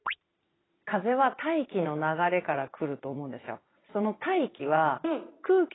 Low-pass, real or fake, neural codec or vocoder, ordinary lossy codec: 7.2 kHz; fake; vocoder, 22.05 kHz, 80 mel bands, Vocos; AAC, 16 kbps